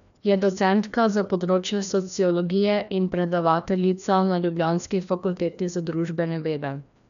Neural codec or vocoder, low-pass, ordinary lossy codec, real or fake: codec, 16 kHz, 1 kbps, FreqCodec, larger model; 7.2 kHz; none; fake